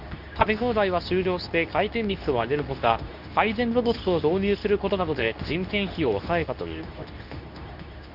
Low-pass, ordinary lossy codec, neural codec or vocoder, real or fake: 5.4 kHz; none; codec, 24 kHz, 0.9 kbps, WavTokenizer, medium speech release version 2; fake